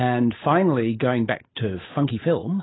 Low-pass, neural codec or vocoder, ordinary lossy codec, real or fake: 7.2 kHz; codec, 16 kHz, 8 kbps, FunCodec, trained on Chinese and English, 25 frames a second; AAC, 16 kbps; fake